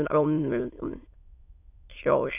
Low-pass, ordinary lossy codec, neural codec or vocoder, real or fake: 3.6 kHz; none; autoencoder, 22.05 kHz, a latent of 192 numbers a frame, VITS, trained on many speakers; fake